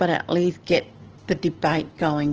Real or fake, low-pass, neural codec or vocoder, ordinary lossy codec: real; 7.2 kHz; none; Opus, 16 kbps